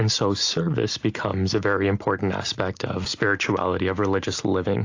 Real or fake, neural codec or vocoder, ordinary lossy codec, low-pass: real; none; AAC, 48 kbps; 7.2 kHz